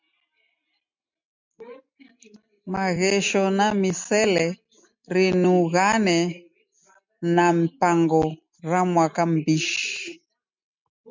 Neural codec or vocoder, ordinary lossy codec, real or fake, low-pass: none; MP3, 64 kbps; real; 7.2 kHz